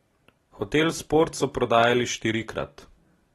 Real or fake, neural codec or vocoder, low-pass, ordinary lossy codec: real; none; 19.8 kHz; AAC, 32 kbps